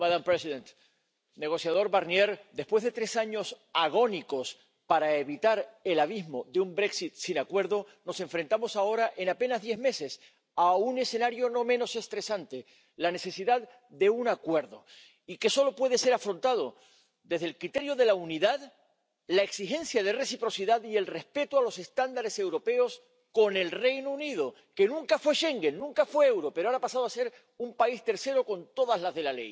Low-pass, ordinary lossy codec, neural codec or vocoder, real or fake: none; none; none; real